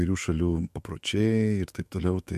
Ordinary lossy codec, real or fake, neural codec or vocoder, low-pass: MP3, 64 kbps; fake; autoencoder, 48 kHz, 128 numbers a frame, DAC-VAE, trained on Japanese speech; 14.4 kHz